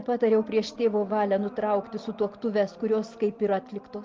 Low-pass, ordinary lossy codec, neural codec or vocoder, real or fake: 7.2 kHz; Opus, 32 kbps; none; real